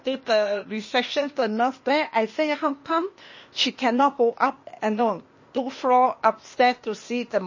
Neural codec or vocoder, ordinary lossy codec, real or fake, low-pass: codec, 16 kHz, 0.8 kbps, ZipCodec; MP3, 32 kbps; fake; 7.2 kHz